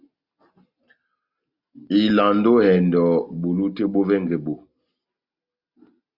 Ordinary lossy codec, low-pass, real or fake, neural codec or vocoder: Opus, 64 kbps; 5.4 kHz; real; none